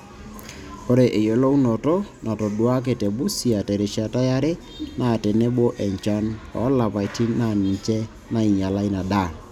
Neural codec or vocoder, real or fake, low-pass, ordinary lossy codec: none; real; 19.8 kHz; none